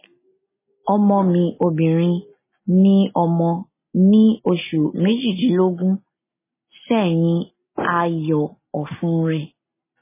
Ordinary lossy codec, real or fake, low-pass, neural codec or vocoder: MP3, 16 kbps; real; 3.6 kHz; none